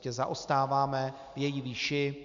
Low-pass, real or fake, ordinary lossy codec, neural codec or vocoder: 7.2 kHz; real; AAC, 64 kbps; none